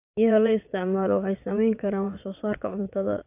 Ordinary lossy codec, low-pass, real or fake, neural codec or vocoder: AAC, 32 kbps; 3.6 kHz; fake; vocoder, 22.05 kHz, 80 mel bands, WaveNeXt